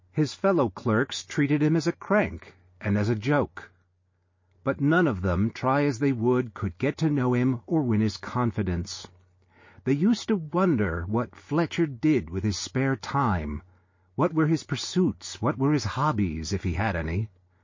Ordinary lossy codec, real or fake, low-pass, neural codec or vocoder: MP3, 32 kbps; real; 7.2 kHz; none